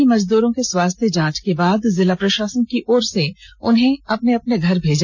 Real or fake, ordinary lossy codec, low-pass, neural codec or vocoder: real; none; 7.2 kHz; none